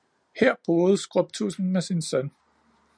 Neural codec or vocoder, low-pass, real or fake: none; 9.9 kHz; real